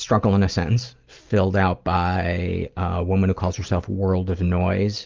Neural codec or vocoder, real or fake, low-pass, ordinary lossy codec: none; real; 7.2 kHz; Opus, 32 kbps